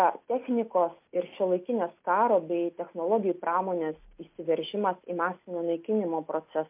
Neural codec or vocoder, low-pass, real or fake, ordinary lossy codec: none; 3.6 kHz; real; MP3, 32 kbps